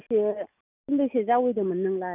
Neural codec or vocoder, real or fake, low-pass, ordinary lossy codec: none; real; 3.6 kHz; none